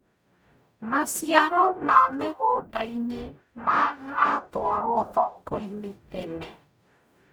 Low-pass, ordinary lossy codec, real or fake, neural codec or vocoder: none; none; fake; codec, 44.1 kHz, 0.9 kbps, DAC